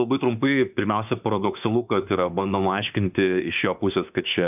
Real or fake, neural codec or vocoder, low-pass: fake; autoencoder, 48 kHz, 32 numbers a frame, DAC-VAE, trained on Japanese speech; 3.6 kHz